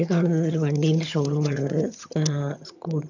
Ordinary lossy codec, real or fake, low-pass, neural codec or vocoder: none; fake; 7.2 kHz; vocoder, 22.05 kHz, 80 mel bands, HiFi-GAN